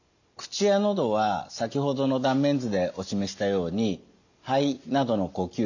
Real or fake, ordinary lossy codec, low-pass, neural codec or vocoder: real; none; 7.2 kHz; none